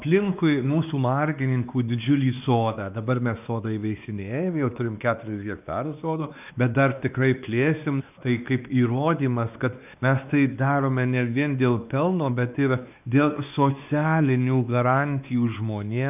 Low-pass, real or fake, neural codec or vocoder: 3.6 kHz; fake; codec, 16 kHz, 2 kbps, X-Codec, WavLM features, trained on Multilingual LibriSpeech